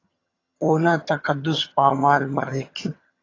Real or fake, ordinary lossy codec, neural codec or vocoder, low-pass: fake; AAC, 32 kbps; vocoder, 22.05 kHz, 80 mel bands, HiFi-GAN; 7.2 kHz